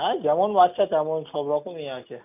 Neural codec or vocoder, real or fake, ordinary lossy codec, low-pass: none; real; none; 3.6 kHz